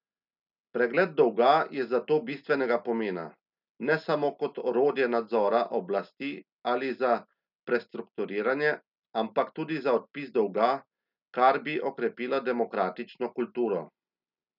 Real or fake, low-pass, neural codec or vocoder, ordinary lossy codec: real; 5.4 kHz; none; none